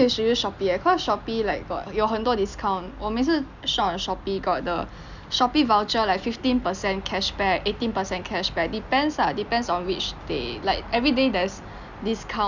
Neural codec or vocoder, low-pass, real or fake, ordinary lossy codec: none; 7.2 kHz; real; none